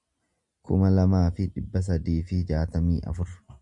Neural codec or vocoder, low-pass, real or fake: none; 10.8 kHz; real